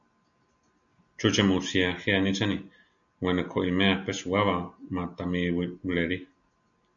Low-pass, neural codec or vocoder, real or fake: 7.2 kHz; none; real